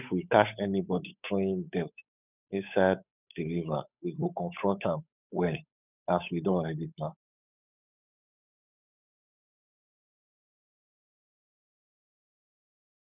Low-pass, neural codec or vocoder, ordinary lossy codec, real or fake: 3.6 kHz; codec, 16 kHz, 8 kbps, FunCodec, trained on Chinese and English, 25 frames a second; none; fake